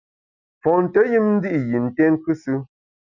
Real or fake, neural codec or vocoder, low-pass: real; none; 7.2 kHz